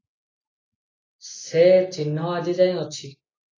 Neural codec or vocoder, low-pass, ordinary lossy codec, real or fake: none; 7.2 kHz; AAC, 32 kbps; real